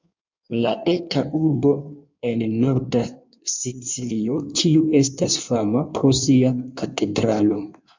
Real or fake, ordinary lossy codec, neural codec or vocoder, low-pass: fake; MP3, 64 kbps; codec, 16 kHz in and 24 kHz out, 1.1 kbps, FireRedTTS-2 codec; 7.2 kHz